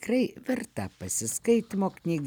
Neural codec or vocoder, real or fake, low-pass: none; real; 19.8 kHz